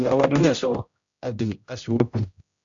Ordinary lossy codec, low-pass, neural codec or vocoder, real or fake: AAC, 64 kbps; 7.2 kHz; codec, 16 kHz, 0.5 kbps, X-Codec, HuBERT features, trained on general audio; fake